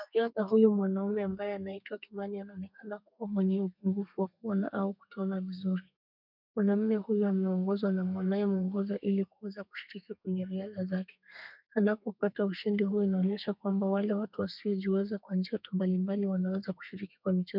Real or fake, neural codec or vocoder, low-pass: fake; codec, 32 kHz, 1.9 kbps, SNAC; 5.4 kHz